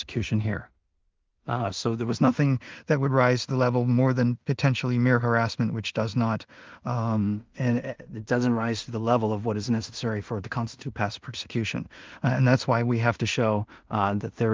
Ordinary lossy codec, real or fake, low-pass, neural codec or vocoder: Opus, 32 kbps; fake; 7.2 kHz; codec, 16 kHz in and 24 kHz out, 0.4 kbps, LongCat-Audio-Codec, two codebook decoder